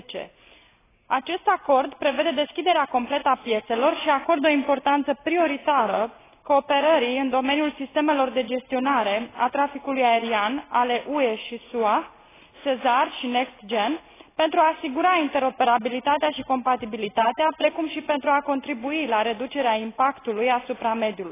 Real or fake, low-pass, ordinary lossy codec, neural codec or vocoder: real; 3.6 kHz; AAC, 16 kbps; none